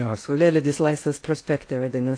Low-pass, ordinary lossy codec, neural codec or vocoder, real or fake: 9.9 kHz; AAC, 48 kbps; codec, 16 kHz in and 24 kHz out, 0.6 kbps, FocalCodec, streaming, 2048 codes; fake